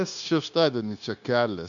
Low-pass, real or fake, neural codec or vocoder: 7.2 kHz; fake; codec, 16 kHz, 0.9 kbps, LongCat-Audio-Codec